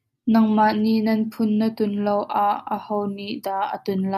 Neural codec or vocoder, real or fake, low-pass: none; real; 14.4 kHz